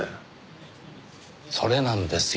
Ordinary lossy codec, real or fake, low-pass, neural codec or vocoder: none; real; none; none